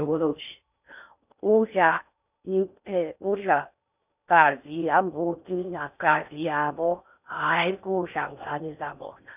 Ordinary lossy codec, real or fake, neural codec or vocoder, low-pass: none; fake; codec, 16 kHz in and 24 kHz out, 0.6 kbps, FocalCodec, streaming, 4096 codes; 3.6 kHz